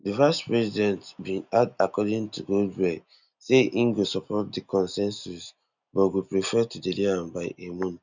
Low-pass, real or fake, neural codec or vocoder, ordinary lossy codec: 7.2 kHz; real; none; none